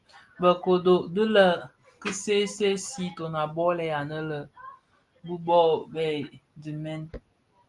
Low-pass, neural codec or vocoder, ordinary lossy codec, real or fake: 10.8 kHz; none; Opus, 32 kbps; real